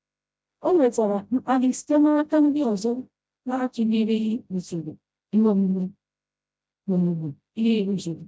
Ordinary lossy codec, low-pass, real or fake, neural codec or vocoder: none; none; fake; codec, 16 kHz, 0.5 kbps, FreqCodec, smaller model